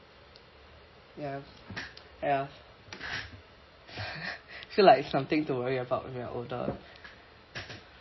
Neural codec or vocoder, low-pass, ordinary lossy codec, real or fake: none; 7.2 kHz; MP3, 24 kbps; real